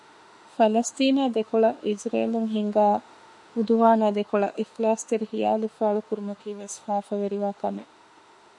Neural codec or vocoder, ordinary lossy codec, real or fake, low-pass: autoencoder, 48 kHz, 32 numbers a frame, DAC-VAE, trained on Japanese speech; MP3, 48 kbps; fake; 10.8 kHz